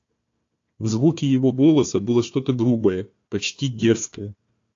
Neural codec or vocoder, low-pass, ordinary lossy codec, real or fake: codec, 16 kHz, 1 kbps, FunCodec, trained on Chinese and English, 50 frames a second; 7.2 kHz; AAC, 48 kbps; fake